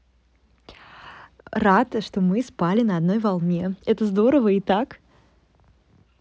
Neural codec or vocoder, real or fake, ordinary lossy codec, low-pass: none; real; none; none